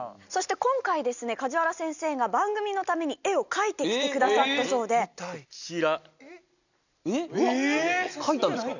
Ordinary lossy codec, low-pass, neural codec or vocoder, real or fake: none; 7.2 kHz; none; real